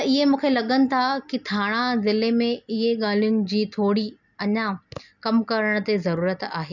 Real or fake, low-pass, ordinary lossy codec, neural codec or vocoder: real; 7.2 kHz; none; none